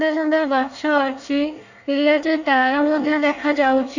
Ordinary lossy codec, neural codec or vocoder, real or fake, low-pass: none; codec, 24 kHz, 1 kbps, SNAC; fake; 7.2 kHz